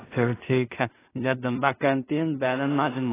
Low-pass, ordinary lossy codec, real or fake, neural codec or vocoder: 3.6 kHz; AAC, 16 kbps; fake; codec, 16 kHz in and 24 kHz out, 0.4 kbps, LongCat-Audio-Codec, two codebook decoder